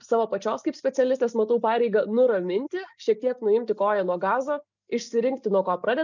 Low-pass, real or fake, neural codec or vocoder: 7.2 kHz; real; none